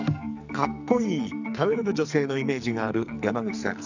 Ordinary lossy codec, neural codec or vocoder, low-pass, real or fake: none; codec, 44.1 kHz, 2.6 kbps, SNAC; 7.2 kHz; fake